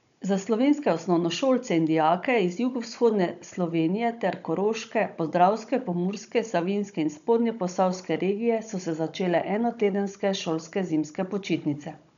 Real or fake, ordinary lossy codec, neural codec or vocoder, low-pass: fake; none; codec, 16 kHz, 16 kbps, FunCodec, trained on Chinese and English, 50 frames a second; 7.2 kHz